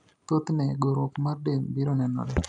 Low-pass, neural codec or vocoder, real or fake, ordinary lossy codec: 10.8 kHz; none; real; MP3, 96 kbps